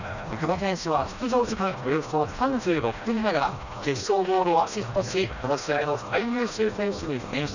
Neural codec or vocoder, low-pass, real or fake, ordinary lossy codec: codec, 16 kHz, 1 kbps, FreqCodec, smaller model; 7.2 kHz; fake; none